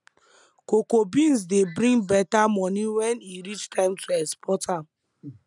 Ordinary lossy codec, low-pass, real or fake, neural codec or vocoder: none; 10.8 kHz; real; none